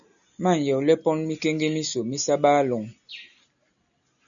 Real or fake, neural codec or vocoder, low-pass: real; none; 7.2 kHz